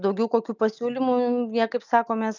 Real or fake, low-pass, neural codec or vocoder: real; 7.2 kHz; none